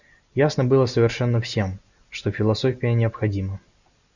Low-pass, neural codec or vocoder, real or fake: 7.2 kHz; none; real